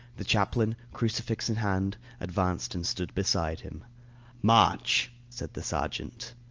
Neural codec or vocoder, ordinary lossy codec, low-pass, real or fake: none; Opus, 24 kbps; 7.2 kHz; real